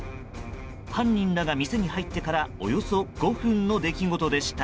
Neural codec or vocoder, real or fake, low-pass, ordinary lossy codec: none; real; none; none